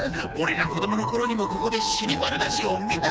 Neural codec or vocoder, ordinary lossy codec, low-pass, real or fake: codec, 16 kHz, 2 kbps, FreqCodec, smaller model; none; none; fake